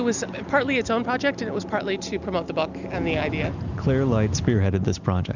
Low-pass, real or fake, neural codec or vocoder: 7.2 kHz; real; none